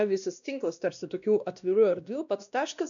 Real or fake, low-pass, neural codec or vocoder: fake; 7.2 kHz; codec, 16 kHz, 1 kbps, X-Codec, WavLM features, trained on Multilingual LibriSpeech